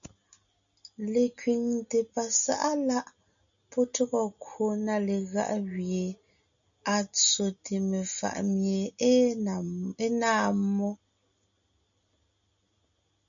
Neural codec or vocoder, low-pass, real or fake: none; 7.2 kHz; real